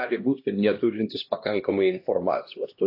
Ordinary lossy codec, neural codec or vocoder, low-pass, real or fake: AAC, 32 kbps; codec, 16 kHz, 1 kbps, X-Codec, HuBERT features, trained on LibriSpeech; 5.4 kHz; fake